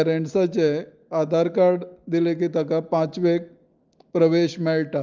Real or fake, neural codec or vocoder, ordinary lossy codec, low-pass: real; none; Opus, 32 kbps; 7.2 kHz